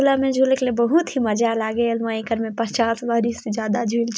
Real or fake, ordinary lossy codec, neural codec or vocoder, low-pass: real; none; none; none